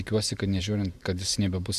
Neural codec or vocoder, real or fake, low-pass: vocoder, 44.1 kHz, 128 mel bands every 512 samples, BigVGAN v2; fake; 14.4 kHz